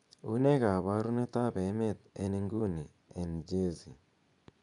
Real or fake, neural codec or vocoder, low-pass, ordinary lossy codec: fake; vocoder, 24 kHz, 100 mel bands, Vocos; 10.8 kHz; none